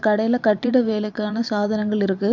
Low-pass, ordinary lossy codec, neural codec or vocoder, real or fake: 7.2 kHz; none; vocoder, 44.1 kHz, 128 mel bands every 512 samples, BigVGAN v2; fake